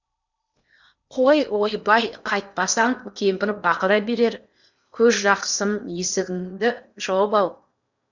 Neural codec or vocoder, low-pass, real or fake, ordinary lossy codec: codec, 16 kHz in and 24 kHz out, 0.8 kbps, FocalCodec, streaming, 65536 codes; 7.2 kHz; fake; none